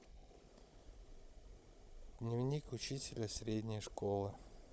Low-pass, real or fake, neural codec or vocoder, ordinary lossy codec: none; fake; codec, 16 kHz, 16 kbps, FunCodec, trained on Chinese and English, 50 frames a second; none